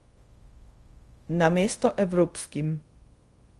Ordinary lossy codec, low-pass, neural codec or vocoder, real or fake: Opus, 24 kbps; 10.8 kHz; codec, 24 kHz, 0.5 kbps, DualCodec; fake